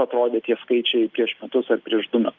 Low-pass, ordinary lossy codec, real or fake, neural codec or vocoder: 7.2 kHz; Opus, 24 kbps; real; none